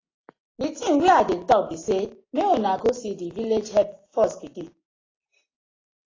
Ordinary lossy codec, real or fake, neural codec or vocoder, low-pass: AAC, 32 kbps; real; none; 7.2 kHz